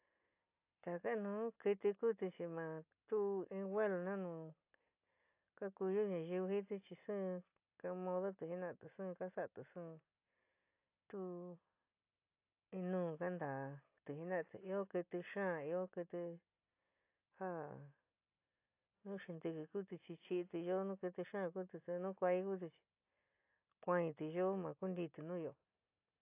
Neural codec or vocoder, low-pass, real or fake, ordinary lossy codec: none; 3.6 kHz; real; AAC, 32 kbps